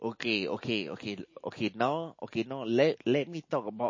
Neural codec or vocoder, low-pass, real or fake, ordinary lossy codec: none; 7.2 kHz; real; MP3, 32 kbps